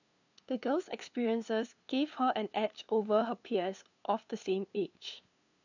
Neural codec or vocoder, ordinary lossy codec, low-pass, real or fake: codec, 16 kHz, 2 kbps, FunCodec, trained on LibriTTS, 25 frames a second; none; 7.2 kHz; fake